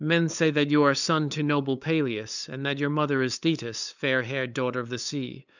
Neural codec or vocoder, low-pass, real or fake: codec, 16 kHz, 8 kbps, FunCodec, trained on LibriTTS, 25 frames a second; 7.2 kHz; fake